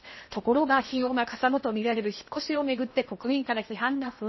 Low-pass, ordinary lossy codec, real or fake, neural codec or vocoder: 7.2 kHz; MP3, 24 kbps; fake; codec, 16 kHz in and 24 kHz out, 0.8 kbps, FocalCodec, streaming, 65536 codes